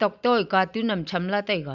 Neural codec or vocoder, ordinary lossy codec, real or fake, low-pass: none; none; real; 7.2 kHz